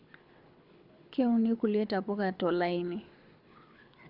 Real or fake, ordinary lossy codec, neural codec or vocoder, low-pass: fake; Opus, 64 kbps; codec, 24 kHz, 6 kbps, HILCodec; 5.4 kHz